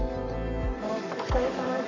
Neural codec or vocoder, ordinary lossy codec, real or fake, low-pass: codec, 44.1 kHz, 7.8 kbps, Pupu-Codec; none; fake; 7.2 kHz